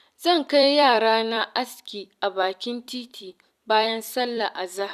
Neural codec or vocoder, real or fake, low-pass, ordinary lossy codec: vocoder, 44.1 kHz, 128 mel bands every 256 samples, BigVGAN v2; fake; 14.4 kHz; none